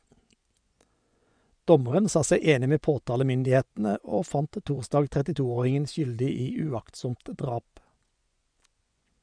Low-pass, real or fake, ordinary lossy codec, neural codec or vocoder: 9.9 kHz; fake; none; vocoder, 24 kHz, 100 mel bands, Vocos